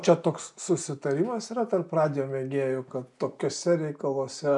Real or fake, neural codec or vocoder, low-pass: real; none; 10.8 kHz